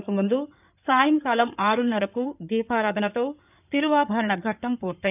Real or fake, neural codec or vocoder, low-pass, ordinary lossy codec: fake; codec, 16 kHz in and 24 kHz out, 2.2 kbps, FireRedTTS-2 codec; 3.6 kHz; none